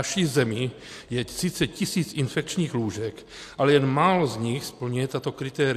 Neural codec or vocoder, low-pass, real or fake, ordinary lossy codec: none; 14.4 kHz; real; AAC, 64 kbps